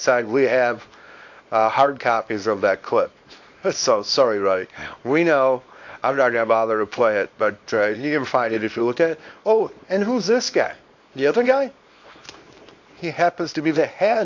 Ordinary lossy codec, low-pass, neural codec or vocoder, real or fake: AAC, 48 kbps; 7.2 kHz; codec, 24 kHz, 0.9 kbps, WavTokenizer, small release; fake